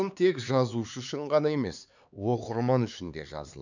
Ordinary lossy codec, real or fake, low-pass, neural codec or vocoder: none; fake; 7.2 kHz; codec, 16 kHz, 4 kbps, X-Codec, HuBERT features, trained on LibriSpeech